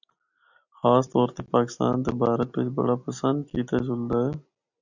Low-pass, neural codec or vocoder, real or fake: 7.2 kHz; none; real